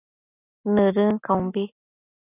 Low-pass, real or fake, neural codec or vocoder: 3.6 kHz; real; none